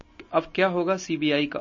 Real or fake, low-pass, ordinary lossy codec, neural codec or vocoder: real; 7.2 kHz; MP3, 32 kbps; none